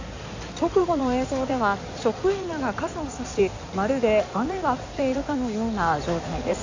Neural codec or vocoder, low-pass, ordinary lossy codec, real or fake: codec, 16 kHz in and 24 kHz out, 2.2 kbps, FireRedTTS-2 codec; 7.2 kHz; AAC, 48 kbps; fake